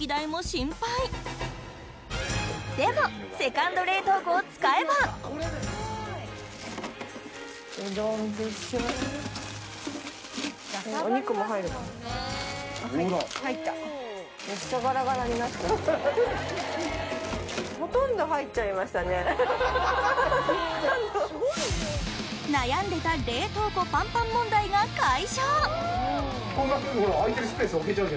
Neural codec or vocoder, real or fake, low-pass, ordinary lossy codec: none; real; none; none